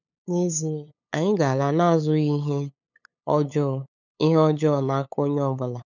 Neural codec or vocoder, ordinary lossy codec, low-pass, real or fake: codec, 16 kHz, 8 kbps, FunCodec, trained on LibriTTS, 25 frames a second; none; 7.2 kHz; fake